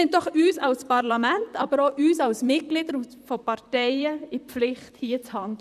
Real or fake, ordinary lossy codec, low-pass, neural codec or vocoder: fake; none; 14.4 kHz; vocoder, 44.1 kHz, 128 mel bands, Pupu-Vocoder